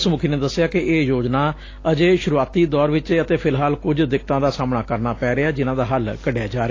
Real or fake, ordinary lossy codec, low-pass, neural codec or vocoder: real; AAC, 32 kbps; 7.2 kHz; none